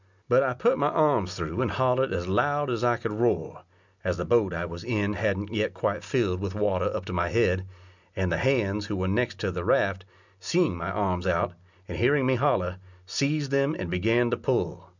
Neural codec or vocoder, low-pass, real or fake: none; 7.2 kHz; real